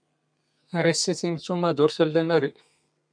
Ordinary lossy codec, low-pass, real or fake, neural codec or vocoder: MP3, 96 kbps; 9.9 kHz; fake; codec, 32 kHz, 1.9 kbps, SNAC